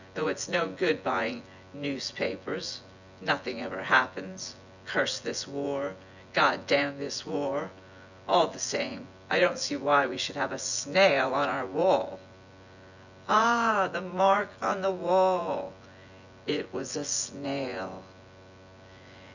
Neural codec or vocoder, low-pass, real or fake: vocoder, 24 kHz, 100 mel bands, Vocos; 7.2 kHz; fake